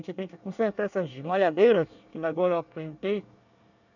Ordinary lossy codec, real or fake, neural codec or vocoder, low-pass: none; fake; codec, 24 kHz, 1 kbps, SNAC; 7.2 kHz